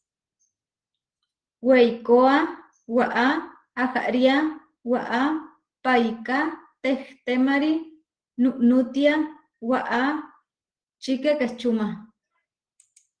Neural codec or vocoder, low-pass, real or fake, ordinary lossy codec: none; 9.9 kHz; real; Opus, 16 kbps